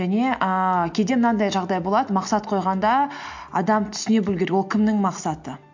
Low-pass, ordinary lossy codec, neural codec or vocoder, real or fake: 7.2 kHz; MP3, 48 kbps; none; real